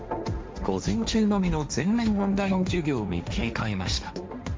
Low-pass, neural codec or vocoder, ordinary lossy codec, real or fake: none; codec, 16 kHz, 1.1 kbps, Voila-Tokenizer; none; fake